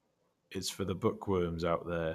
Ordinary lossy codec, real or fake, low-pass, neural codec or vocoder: none; real; 14.4 kHz; none